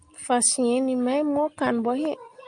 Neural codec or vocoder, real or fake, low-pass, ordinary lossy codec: none; real; 9.9 kHz; Opus, 32 kbps